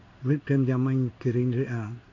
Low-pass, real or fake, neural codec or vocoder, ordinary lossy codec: 7.2 kHz; fake; codec, 16 kHz in and 24 kHz out, 1 kbps, XY-Tokenizer; none